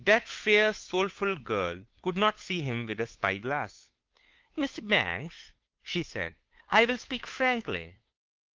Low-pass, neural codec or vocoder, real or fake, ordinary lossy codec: 7.2 kHz; codec, 16 kHz, 2 kbps, FunCodec, trained on Chinese and English, 25 frames a second; fake; Opus, 32 kbps